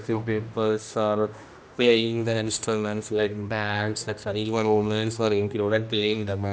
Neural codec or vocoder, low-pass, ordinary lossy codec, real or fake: codec, 16 kHz, 1 kbps, X-Codec, HuBERT features, trained on general audio; none; none; fake